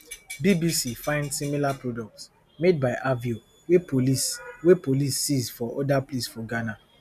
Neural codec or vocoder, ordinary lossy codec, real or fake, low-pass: none; none; real; 14.4 kHz